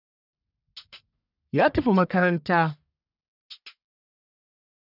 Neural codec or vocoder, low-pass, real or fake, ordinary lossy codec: codec, 44.1 kHz, 1.7 kbps, Pupu-Codec; 5.4 kHz; fake; none